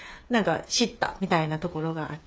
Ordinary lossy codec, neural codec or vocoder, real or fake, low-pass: none; codec, 16 kHz, 8 kbps, FreqCodec, smaller model; fake; none